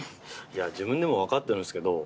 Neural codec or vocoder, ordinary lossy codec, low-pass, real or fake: none; none; none; real